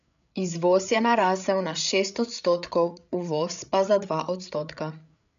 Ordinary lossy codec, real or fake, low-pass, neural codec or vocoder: none; fake; 7.2 kHz; codec, 16 kHz, 8 kbps, FreqCodec, larger model